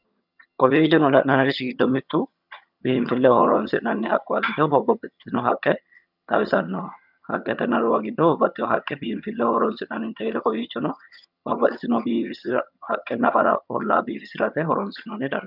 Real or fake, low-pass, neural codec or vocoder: fake; 5.4 kHz; vocoder, 22.05 kHz, 80 mel bands, HiFi-GAN